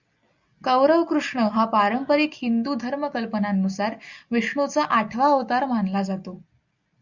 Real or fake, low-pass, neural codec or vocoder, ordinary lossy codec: real; 7.2 kHz; none; Opus, 64 kbps